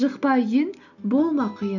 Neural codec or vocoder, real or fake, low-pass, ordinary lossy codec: none; real; 7.2 kHz; none